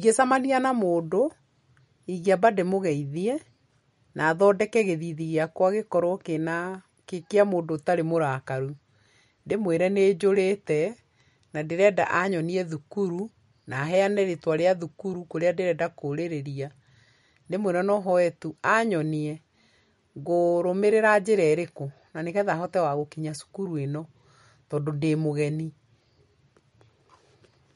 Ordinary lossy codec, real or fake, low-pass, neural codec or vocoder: MP3, 48 kbps; real; 19.8 kHz; none